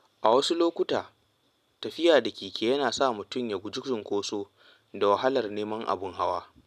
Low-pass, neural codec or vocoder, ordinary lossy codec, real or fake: 14.4 kHz; none; none; real